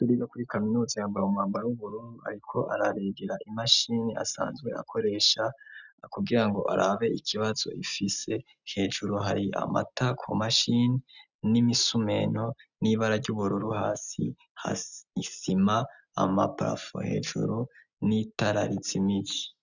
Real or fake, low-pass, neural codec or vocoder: real; 7.2 kHz; none